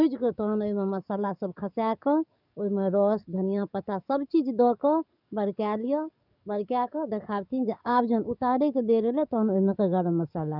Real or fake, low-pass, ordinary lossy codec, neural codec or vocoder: fake; 5.4 kHz; none; codec, 44.1 kHz, 7.8 kbps, DAC